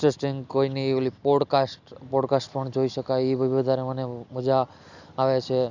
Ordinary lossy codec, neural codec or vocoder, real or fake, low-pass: none; none; real; 7.2 kHz